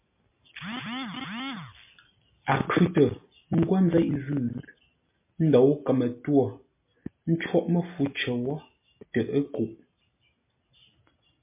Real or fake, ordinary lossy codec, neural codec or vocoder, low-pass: real; MP3, 24 kbps; none; 3.6 kHz